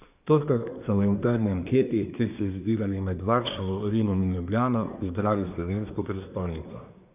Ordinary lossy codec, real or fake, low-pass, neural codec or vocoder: none; fake; 3.6 kHz; codec, 24 kHz, 1 kbps, SNAC